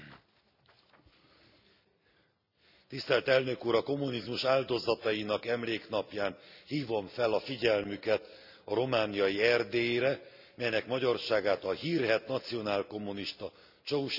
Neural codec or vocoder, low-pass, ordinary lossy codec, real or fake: none; 5.4 kHz; none; real